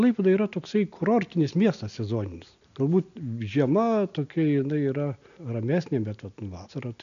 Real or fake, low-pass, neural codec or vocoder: real; 7.2 kHz; none